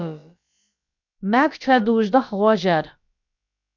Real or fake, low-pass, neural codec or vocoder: fake; 7.2 kHz; codec, 16 kHz, about 1 kbps, DyCAST, with the encoder's durations